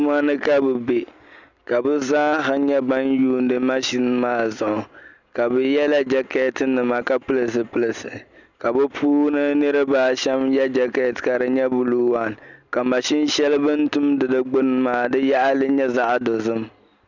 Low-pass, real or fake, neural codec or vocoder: 7.2 kHz; real; none